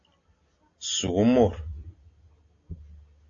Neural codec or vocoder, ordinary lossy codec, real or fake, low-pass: none; AAC, 48 kbps; real; 7.2 kHz